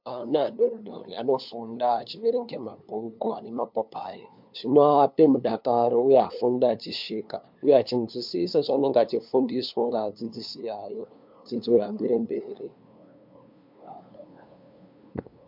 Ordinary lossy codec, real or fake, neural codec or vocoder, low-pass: MP3, 48 kbps; fake; codec, 16 kHz, 2 kbps, FunCodec, trained on LibriTTS, 25 frames a second; 5.4 kHz